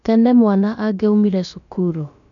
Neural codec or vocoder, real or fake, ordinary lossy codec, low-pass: codec, 16 kHz, about 1 kbps, DyCAST, with the encoder's durations; fake; none; 7.2 kHz